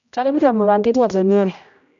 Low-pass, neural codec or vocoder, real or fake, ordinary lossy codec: 7.2 kHz; codec, 16 kHz, 0.5 kbps, X-Codec, HuBERT features, trained on general audio; fake; none